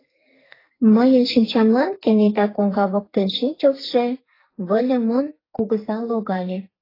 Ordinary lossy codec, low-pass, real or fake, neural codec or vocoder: AAC, 24 kbps; 5.4 kHz; fake; codec, 44.1 kHz, 2.6 kbps, SNAC